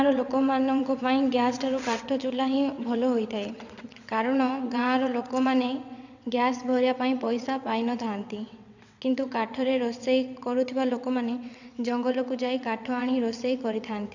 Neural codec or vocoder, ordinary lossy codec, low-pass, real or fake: vocoder, 22.05 kHz, 80 mel bands, WaveNeXt; none; 7.2 kHz; fake